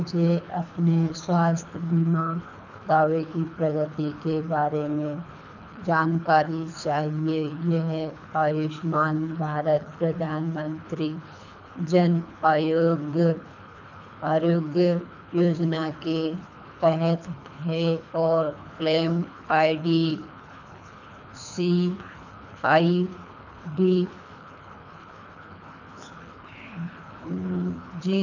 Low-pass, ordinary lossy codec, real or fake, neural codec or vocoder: 7.2 kHz; none; fake; codec, 24 kHz, 3 kbps, HILCodec